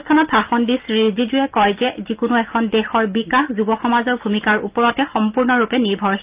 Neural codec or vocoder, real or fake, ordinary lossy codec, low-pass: none; real; Opus, 32 kbps; 3.6 kHz